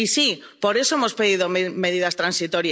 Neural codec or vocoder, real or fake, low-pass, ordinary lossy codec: none; real; none; none